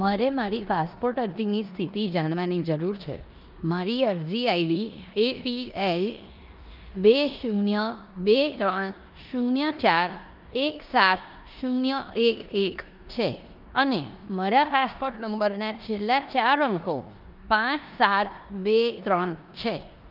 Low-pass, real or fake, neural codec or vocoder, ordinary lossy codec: 5.4 kHz; fake; codec, 16 kHz in and 24 kHz out, 0.9 kbps, LongCat-Audio-Codec, four codebook decoder; Opus, 24 kbps